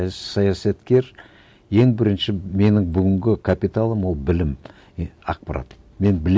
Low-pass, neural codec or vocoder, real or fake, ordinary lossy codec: none; none; real; none